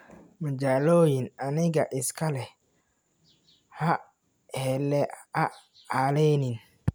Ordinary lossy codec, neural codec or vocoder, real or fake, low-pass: none; none; real; none